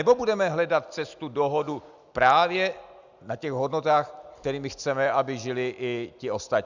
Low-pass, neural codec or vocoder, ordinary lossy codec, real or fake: 7.2 kHz; none; Opus, 64 kbps; real